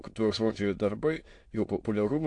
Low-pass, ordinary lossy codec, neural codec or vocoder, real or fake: 9.9 kHz; AAC, 48 kbps; autoencoder, 22.05 kHz, a latent of 192 numbers a frame, VITS, trained on many speakers; fake